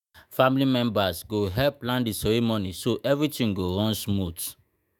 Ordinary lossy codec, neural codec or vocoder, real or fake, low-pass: none; autoencoder, 48 kHz, 128 numbers a frame, DAC-VAE, trained on Japanese speech; fake; 19.8 kHz